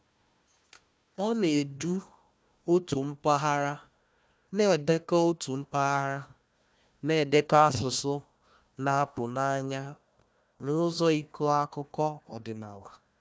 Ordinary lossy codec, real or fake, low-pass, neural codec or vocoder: none; fake; none; codec, 16 kHz, 1 kbps, FunCodec, trained on Chinese and English, 50 frames a second